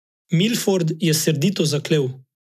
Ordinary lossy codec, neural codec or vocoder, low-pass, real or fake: none; none; 14.4 kHz; real